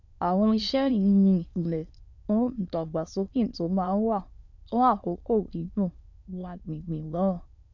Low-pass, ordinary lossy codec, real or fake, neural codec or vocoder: 7.2 kHz; none; fake; autoencoder, 22.05 kHz, a latent of 192 numbers a frame, VITS, trained on many speakers